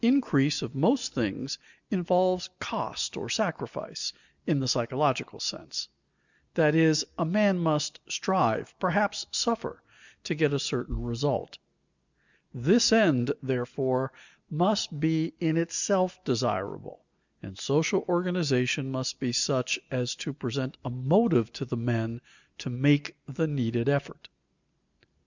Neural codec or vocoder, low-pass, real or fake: none; 7.2 kHz; real